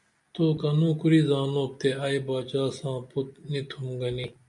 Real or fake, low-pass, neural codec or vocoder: real; 10.8 kHz; none